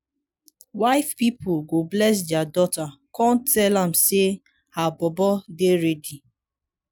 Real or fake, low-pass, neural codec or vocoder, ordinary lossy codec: real; none; none; none